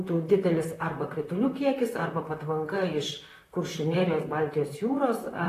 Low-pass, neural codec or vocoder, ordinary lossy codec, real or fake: 14.4 kHz; vocoder, 44.1 kHz, 128 mel bands, Pupu-Vocoder; AAC, 48 kbps; fake